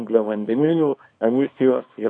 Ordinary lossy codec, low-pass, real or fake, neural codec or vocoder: AAC, 64 kbps; 9.9 kHz; fake; codec, 24 kHz, 0.9 kbps, WavTokenizer, small release